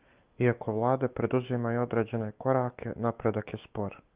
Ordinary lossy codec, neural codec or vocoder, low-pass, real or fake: Opus, 32 kbps; autoencoder, 48 kHz, 128 numbers a frame, DAC-VAE, trained on Japanese speech; 3.6 kHz; fake